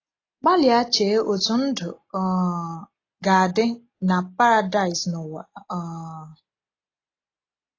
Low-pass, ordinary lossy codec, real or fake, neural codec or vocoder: 7.2 kHz; AAC, 32 kbps; real; none